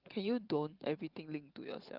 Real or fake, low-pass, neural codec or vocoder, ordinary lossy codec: fake; 5.4 kHz; vocoder, 44.1 kHz, 128 mel bands every 512 samples, BigVGAN v2; Opus, 32 kbps